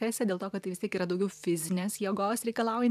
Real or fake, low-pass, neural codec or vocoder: fake; 14.4 kHz; vocoder, 44.1 kHz, 128 mel bands every 512 samples, BigVGAN v2